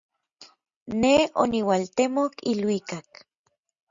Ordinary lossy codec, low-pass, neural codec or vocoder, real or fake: Opus, 64 kbps; 7.2 kHz; none; real